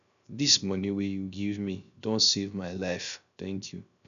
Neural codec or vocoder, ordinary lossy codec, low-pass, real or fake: codec, 16 kHz, 0.3 kbps, FocalCodec; none; 7.2 kHz; fake